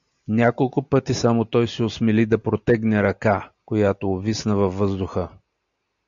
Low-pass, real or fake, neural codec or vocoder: 7.2 kHz; real; none